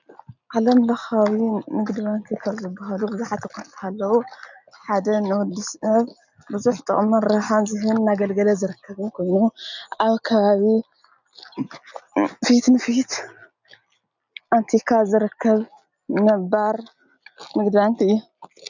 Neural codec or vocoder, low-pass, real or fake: none; 7.2 kHz; real